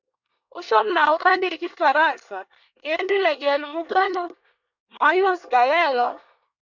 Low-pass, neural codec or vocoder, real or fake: 7.2 kHz; codec, 24 kHz, 1 kbps, SNAC; fake